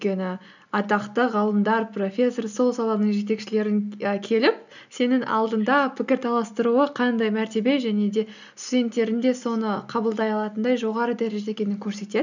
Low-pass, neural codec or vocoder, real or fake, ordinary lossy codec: 7.2 kHz; none; real; none